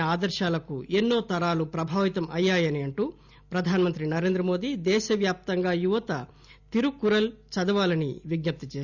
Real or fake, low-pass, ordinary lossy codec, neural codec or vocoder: real; 7.2 kHz; Opus, 64 kbps; none